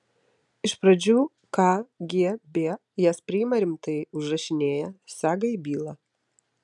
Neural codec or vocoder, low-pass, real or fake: none; 10.8 kHz; real